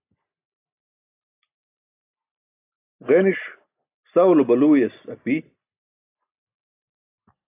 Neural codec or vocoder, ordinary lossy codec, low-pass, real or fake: none; AAC, 24 kbps; 3.6 kHz; real